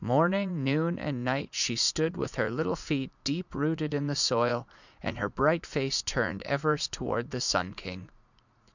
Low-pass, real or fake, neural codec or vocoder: 7.2 kHz; fake; vocoder, 44.1 kHz, 80 mel bands, Vocos